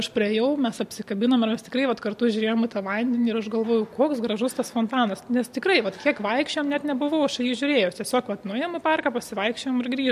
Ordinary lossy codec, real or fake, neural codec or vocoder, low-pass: MP3, 64 kbps; real; none; 14.4 kHz